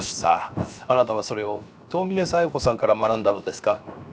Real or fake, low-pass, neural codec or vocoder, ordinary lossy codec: fake; none; codec, 16 kHz, 0.7 kbps, FocalCodec; none